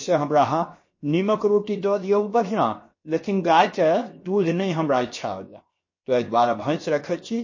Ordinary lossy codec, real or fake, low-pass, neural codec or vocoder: MP3, 32 kbps; fake; 7.2 kHz; codec, 16 kHz, 0.7 kbps, FocalCodec